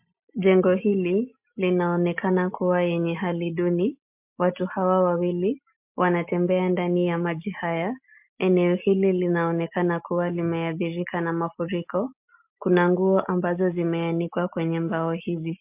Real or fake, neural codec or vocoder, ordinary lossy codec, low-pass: real; none; MP3, 32 kbps; 3.6 kHz